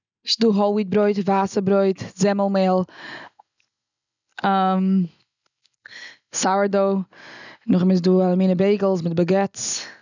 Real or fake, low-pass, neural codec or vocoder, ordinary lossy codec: real; 7.2 kHz; none; none